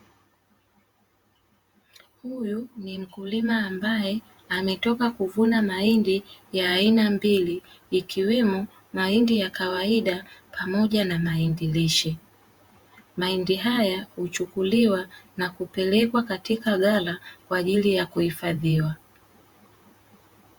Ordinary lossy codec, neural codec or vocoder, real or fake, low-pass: Opus, 64 kbps; vocoder, 48 kHz, 128 mel bands, Vocos; fake; 19.8 kHz